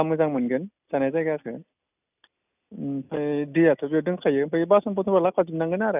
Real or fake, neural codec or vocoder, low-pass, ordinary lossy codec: real; none; 3.6 kHz; none